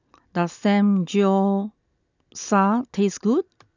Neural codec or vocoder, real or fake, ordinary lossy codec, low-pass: none; real; none; 7.2 kHz